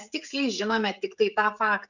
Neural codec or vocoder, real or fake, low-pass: codec, 16 kHz, 16 kbps, FreqCodec, smaller model; fake; 7.2 kHz